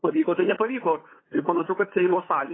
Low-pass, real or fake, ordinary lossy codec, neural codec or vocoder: 7.2 kHz; fake; AAC, 16 kbps; codec, 16 kHz, 8 kbps, FunCodec, trained on LibriTTS, 25 frames a second